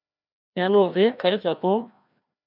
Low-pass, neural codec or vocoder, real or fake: 5.4 kHz; codec, 16 kHz, 1 kbps, FreqCodec, larger model; fake